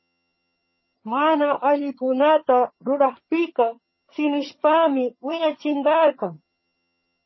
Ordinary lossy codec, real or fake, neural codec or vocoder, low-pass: MP3, 24 kbps; fake; vocoder, 22.05 kHz, 80 mel bands, HiFi-GAN; 7.2 kHz